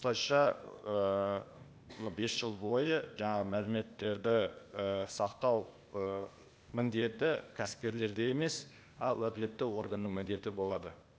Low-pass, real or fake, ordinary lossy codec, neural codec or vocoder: none; fake; none; codec, 16 kHz, 0.8 kbps, ZipCodec